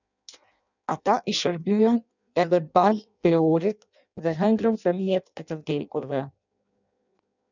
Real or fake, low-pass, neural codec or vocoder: fake; 7.2 kHz; codec, 16 kHz in and 24 kHz out, 0.6 kbps, FireRedTTS-2 codec